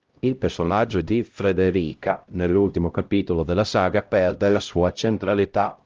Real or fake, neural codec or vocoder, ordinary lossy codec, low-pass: fake; codec, 16 kHz, 0.5 kbps, X-Codec, HuBERT features, trained on LibriSpeech; Opus, 32 kbps; 7.2 kHz